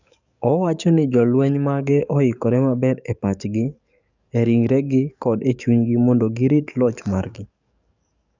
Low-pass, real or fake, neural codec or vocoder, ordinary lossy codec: 7.2 kHz; fake; codec, 44.1 kHz, 7.8 kbps, DAC; none